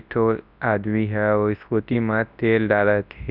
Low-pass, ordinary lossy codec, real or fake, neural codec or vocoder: 5.4 kHz; none; fake; codec, 24 kHz, 0.9 kbps, WavTokenizer, large speech release